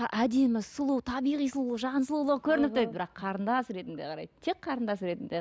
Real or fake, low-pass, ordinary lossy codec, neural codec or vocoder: real; none; none; none